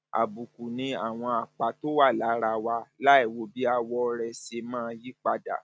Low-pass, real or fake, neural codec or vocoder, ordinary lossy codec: none; real; none; none